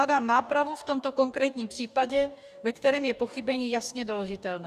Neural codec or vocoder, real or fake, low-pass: codec, 44.1 kHz, 2.6 kbps, DAC; fake; 14.4 kHz